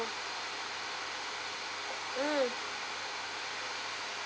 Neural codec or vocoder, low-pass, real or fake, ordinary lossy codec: none; none; real; none